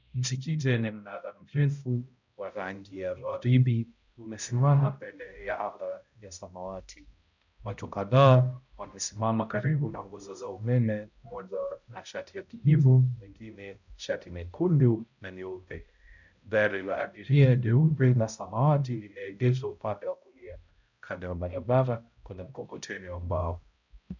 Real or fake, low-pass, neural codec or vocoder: fake; 7.2 kHz; codec, 16 kHz, 0.5 kbps, X-Codec, HuBERT features, trained on balanced general audio